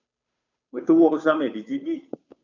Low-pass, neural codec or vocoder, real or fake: 7.2 kHz; codec, 16 kHz, 2 kbps, FunCodec, trained on Chinese and English, 25 frames a second; fake